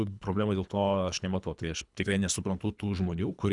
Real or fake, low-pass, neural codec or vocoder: fake; 10.8 kHz; codec, 24 kHz, 3 kbps, HILCodec